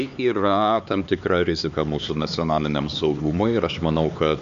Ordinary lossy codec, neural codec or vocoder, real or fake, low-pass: MP3, 64 kbps; codec, 16 kHz, 4 kbps, X-Codec, HuBERT features, trained on LibriSpeech; fake; 7.2 kHz